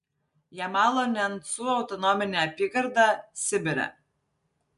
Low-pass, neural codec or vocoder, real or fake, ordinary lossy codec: 10.8 kHz; none; real; MP3, 64 kbps